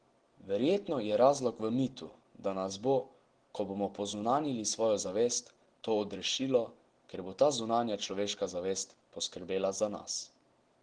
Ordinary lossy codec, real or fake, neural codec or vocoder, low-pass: Opus, 16 kbps; real; none; 9.9 kHz